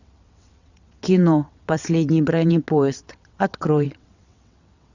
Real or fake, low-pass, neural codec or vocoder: fake; 7.2 kHz; vocoder, 22.05 kHz, 80 mel bands, WaveNeXt